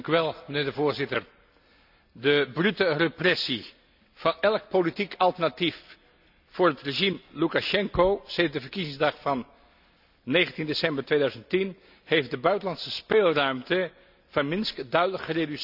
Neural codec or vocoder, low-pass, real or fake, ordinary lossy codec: none; 5.4 kHz; real; none